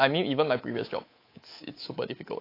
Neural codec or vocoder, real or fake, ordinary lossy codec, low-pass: codec, 24 kHz, 3.1 kbps, DualCodec; fake; AAC, 24 kbps; 5.4 kHz